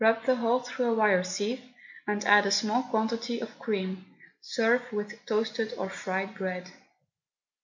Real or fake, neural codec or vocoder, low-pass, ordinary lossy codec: real; none; 7.2 kHz; MP3, 64 kbps